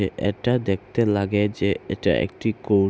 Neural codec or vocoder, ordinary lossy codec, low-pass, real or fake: none; none; none; real